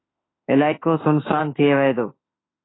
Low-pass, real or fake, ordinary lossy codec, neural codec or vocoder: 7.2 kHz; fake; AAC, 16 kbps; autoencoder, 48 kHz, 32 numbers a frame, DAC-VAE, trained on Japanese speech